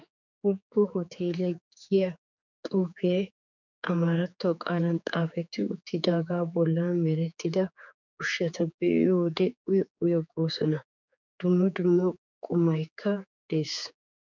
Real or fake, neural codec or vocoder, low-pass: fake; codec, 16 kHz, 4 kbps, X-Codec, HuBERT features, trained on general audio; 7.2 kHz